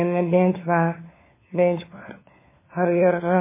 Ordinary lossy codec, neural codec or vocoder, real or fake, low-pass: MP3, 16 kbps; codec, 16 kHz, 4 kbps, FunCodec, trained on LibriTTS, 50 frames a second; fake; 3.6 kHz